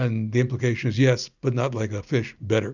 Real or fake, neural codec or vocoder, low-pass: real; none; 7.2 kHz